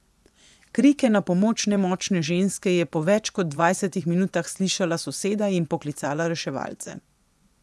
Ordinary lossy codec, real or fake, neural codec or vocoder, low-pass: none; fake; vocoder, 24 kHz, 100 mel bands, Vocos; none